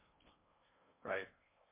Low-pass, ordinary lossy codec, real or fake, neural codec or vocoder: 3.6 kHz; MP3, 24 kbps; fake; codec, 16 kHz in and 24 kHz out, 0.6 kbps, FocalCodec, streaming, 4096 codes